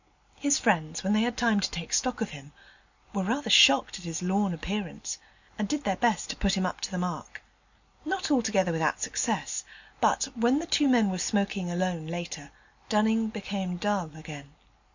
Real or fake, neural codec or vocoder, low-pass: real; none; 7.2 kHz